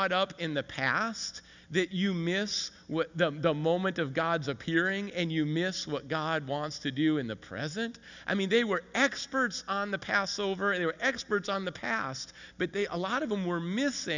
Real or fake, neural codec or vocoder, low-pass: real; none; 7.2 kHz